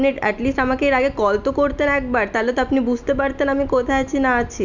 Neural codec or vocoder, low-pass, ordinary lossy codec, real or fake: none; 7.2 kHz; none; real